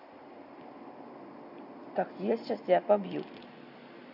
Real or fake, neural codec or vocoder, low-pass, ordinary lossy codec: real; none; 5.4 kHz; none